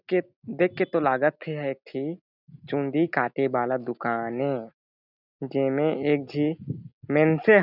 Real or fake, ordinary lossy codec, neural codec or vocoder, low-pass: real; none; none; 5.4 kHz